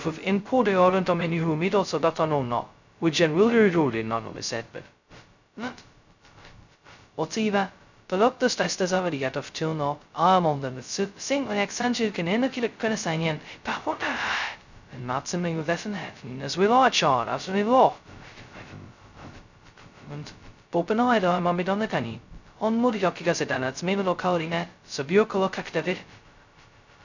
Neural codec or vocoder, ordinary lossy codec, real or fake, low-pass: codec, 16 kHz, 0.2 kbps, FocalCodec; none; fake; 7.2 kHz